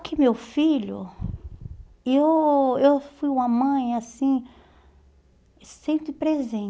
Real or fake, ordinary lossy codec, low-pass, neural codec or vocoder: real; none; none; none